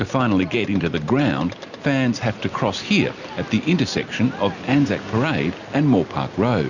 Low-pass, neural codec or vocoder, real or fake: 7.2 kHz; none; real